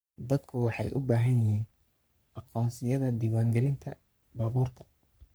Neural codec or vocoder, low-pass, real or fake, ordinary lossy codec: codec, 44.1 kHz, 3.4 kbps, Pupu-Codec; none; fake; none